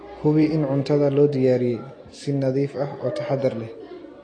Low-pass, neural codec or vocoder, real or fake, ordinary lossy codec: 9.9 kHz; none; real; AAC, 32 kbps